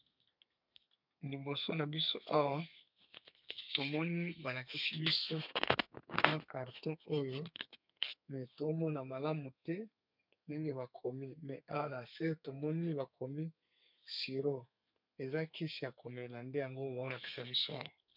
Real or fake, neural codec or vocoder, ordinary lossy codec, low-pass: fake; codec, 32 kHz, 1.9 kbps, SNAC; MP3, 48 kbps; 5.4 kHz